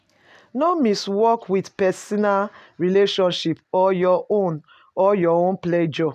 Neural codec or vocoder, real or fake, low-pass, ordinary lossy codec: none; real; 14.4 kHz; none